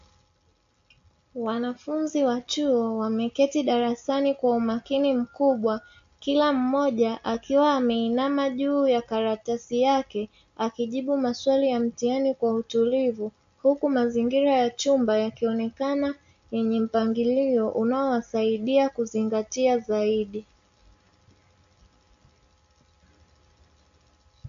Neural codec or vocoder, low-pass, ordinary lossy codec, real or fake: none; 7.2 kHz; MP3, 48 kbps; real